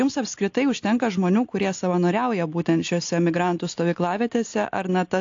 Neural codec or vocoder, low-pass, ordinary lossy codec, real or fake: none; 7.2 kHz; AAC, 48 kbps; real